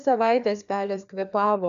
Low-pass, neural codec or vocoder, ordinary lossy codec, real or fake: 7.2 kHz; codec, 16 kHz, 2 kbps, FreqCodec, larger model; MP3, 96 kbps; fake